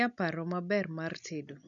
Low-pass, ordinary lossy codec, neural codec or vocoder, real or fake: 7.2 kHz; none; none; real